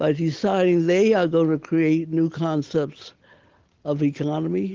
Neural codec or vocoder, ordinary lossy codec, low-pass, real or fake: none; Opus, 16 kbps; 7.2 kHz; real